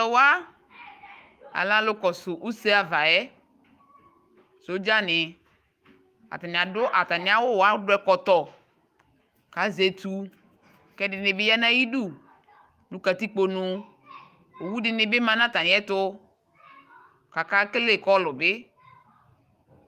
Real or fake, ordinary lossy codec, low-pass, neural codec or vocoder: fake; Opus, 32 kbps; 14.4 kHz; autoencoder, 48 kHz, 128 numbers a frame, DAC-VAE, trained on Japanese speech